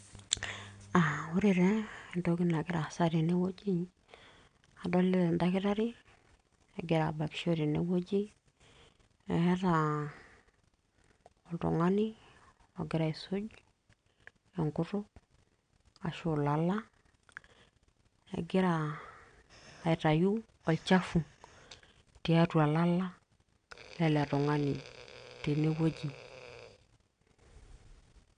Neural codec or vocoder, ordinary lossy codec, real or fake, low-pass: none; none; real; 9.9 kHz